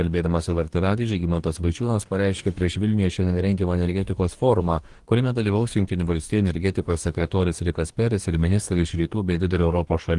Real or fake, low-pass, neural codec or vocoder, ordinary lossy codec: fake; 10.8 kHz; codec, 44.1 kHz, 2.6 kbps, DAC; Opus, 24 kbps